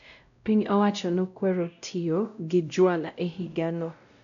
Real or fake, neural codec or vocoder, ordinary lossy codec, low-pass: fake; codec, 16 kHz, 0.5 kbps, X-Codec, WavLM features, trained on Multilingual LibriSpeech; none; 7.2 kHz